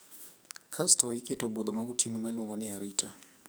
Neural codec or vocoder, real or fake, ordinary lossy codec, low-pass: codec, 44.1 kHz, 2.6 kbps, SNAC; fake; none; none